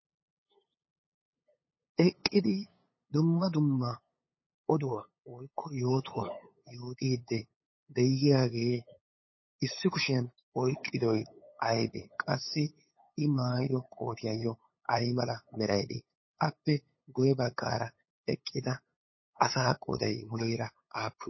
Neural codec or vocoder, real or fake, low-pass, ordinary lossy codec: codec, 16 kHz, 8 kbps, FunCodec, trained on LibriTTS, 25 frames a second; fake; 7.2 kHz; MP3, 24 kbps